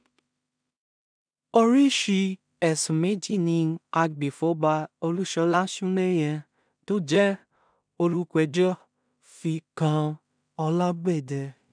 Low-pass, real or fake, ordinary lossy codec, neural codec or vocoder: 9.9 kHz; fake; none; codec, 16 kHz in and 24 kHz out, 0.4 kbps, LongCat-Audio-Codec, two codebook decoder